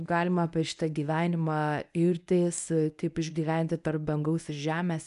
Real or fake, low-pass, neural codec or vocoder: fake; 10.8 kHz; codec, 24 kHz, 0.9 kbps, WavTokenizer, medium speech release version 1